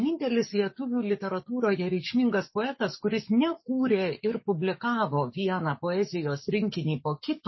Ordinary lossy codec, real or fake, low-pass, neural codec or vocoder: MP3, 24 kbps; fake; 7.2 kHz; codec, 44.1 kHz, 7.8 kbps, DAC